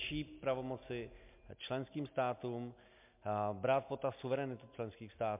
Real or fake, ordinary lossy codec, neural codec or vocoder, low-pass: real; MP3, 32 kbps; none; 3.6 kHz